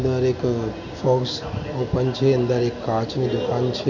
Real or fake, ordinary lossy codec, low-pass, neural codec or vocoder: real; none; 7.2 kHz; none